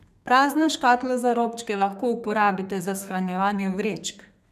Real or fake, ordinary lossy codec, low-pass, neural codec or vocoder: fake; none; 14.4 kHz; codec, 32 kHz, 1.9 kbps, SNAC